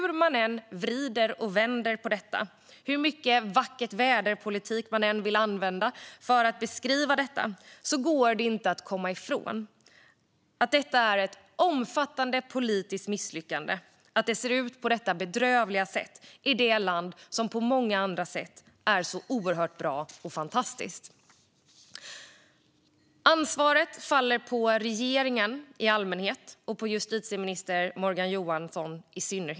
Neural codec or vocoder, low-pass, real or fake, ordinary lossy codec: none; none; real; none